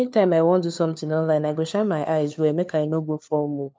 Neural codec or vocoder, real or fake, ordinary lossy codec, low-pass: codec, 16 kHz, 4 kbps, FunCodec, trained on LibriTTS, 50 frames a second; fake; none; none